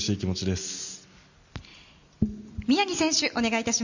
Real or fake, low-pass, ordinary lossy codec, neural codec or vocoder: real; 7.2 kHz; none; none